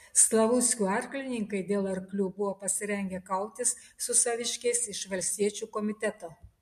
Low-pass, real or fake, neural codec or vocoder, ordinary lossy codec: 14.4 kHz; real; none; MP3, 64 kbps